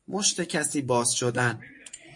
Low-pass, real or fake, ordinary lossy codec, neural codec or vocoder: 10.8 kHz; fake; MP3, 48 kbps; vocoder, 24 kHz, 100 mel bands, Vocos